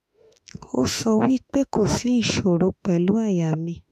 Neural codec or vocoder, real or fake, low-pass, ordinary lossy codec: autoencoder, 48 kHz, 32 numbers a frame, DAC-VAE, trained on Japanese speech; fake; 14.4 kHz; none